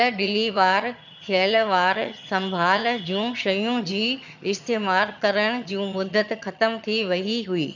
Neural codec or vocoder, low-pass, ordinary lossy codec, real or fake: vocoder, 22.05 kHz, 80 mel bands, HiFi-GAN; 7.2 kHz; none; fake